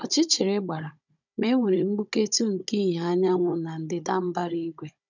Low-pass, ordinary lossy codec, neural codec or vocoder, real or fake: 7.2 kHz; none; codec, 16 kHz, 16 kbps, FunCodec, trained on Chinese and English, 50 frames a second; fake